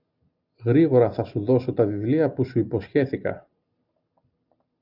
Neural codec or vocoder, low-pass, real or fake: none; 5.4 kHz; real